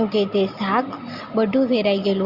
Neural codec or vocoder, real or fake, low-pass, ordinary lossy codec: none; real; 5.4 kHz; Opus, 64 kbps